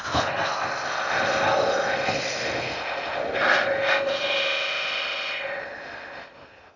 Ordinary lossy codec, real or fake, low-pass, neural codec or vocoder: none; fake; 7.2 kHz; codec, 16 kHz in and 24 kHz out, 0.6 kbps, FocalCodec, streaming, 2048 codes